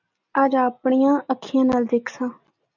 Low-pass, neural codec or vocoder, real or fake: 7.2 kHz; none; real